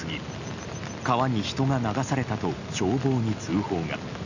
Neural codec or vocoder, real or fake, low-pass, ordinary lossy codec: none; real; 7.2 kHz; none